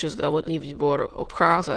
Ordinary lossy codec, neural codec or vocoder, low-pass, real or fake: Opus, 16 kbps; autoencoder, 22.05 kHz, a latent of 192 numbers a frame, VITS, trained on many speakers; 9.9 kHz; fake